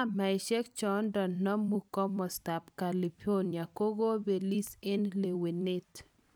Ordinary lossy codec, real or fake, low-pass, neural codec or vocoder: none; fake; none; vocoder, 44.1 kHz, 128 mel bands every 256 samples, BigVGAN v2